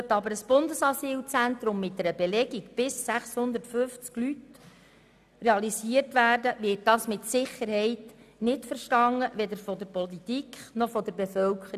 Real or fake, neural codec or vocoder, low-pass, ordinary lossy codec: real; none; 14.4 kHz; none